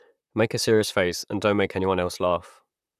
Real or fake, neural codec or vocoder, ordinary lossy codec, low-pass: fake; vocoder, 44.1 kHz, 128 mel bands, Pupu-Vocoder; none; 14.4 kHz